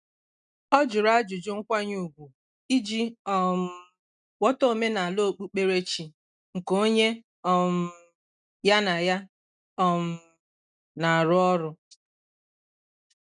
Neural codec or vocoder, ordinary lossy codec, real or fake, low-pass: none; none; real; 9.9 kHz